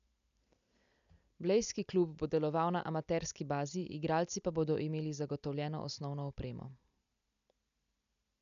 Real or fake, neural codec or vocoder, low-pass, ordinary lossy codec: real; none; 7.2 kHz; none